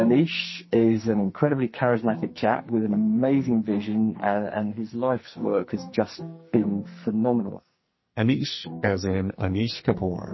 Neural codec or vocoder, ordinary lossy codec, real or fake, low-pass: codec, 44.1 kHz, 2.6 kbps, SNAC; MP3, 24 kbps; fake; 7.2 kHz